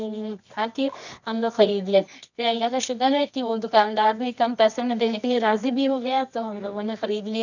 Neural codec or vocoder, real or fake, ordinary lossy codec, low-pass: codec, 24 kHz, 0.9 kbps, WavTokenizer, medium music audio release; fake; none; 7.2 kHz